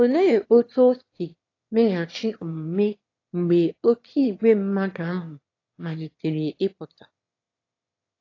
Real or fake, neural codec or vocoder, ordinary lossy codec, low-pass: fake; autoencoder, 22.05 kHz, a latent of 192 numbers a frame, VITS, trained on one speaker; AAC, 32 kbps; 7.2 kHz